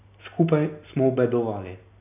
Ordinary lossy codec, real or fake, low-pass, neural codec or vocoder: none; real; 3.6 kHz; none